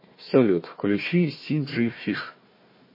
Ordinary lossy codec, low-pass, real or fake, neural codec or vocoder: MP3, 24 kbps; 5.4 kHz; fake; codec, 16 kHz, 1 kbps, FunCodec, trained on Chinese and English, 50 frames a second